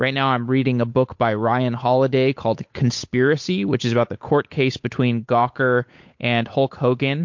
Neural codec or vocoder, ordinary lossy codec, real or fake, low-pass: codec, 16 kHz, 8 kbps, FunCodec, trained on Chinese and English, 25 frames a second; MP3, 48 kbps; fake; 7.2 kHz